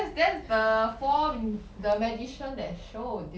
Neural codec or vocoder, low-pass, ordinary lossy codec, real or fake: none; none; none; real